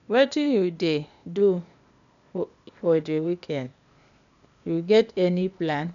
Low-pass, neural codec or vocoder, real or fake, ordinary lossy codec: 7.2 kHz; codec, 16 kHz, 0.8 kbps, ZipCodec; fake; none